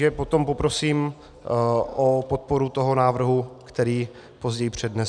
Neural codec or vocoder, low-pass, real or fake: none; 9.9 kHz; real